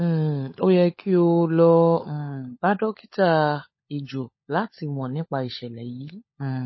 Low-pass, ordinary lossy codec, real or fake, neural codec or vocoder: 7.2 kHz; MP3, 24 kbps; fake; codec, 16 kHz, 16 kbps, FunCodec, trained on LibriTTS, 50 frames a second